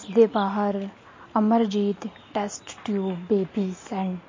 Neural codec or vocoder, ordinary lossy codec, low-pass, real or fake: none; MP3, 32 kbps; 7.2 kHz; real